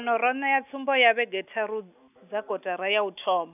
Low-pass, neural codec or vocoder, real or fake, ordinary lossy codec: 3.6 kHz; none; real; none